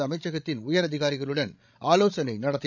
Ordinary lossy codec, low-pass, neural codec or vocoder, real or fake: none; 7.2 kHz; codec, 16 kHz, 16 kbps, FreqCodec, larger model; fake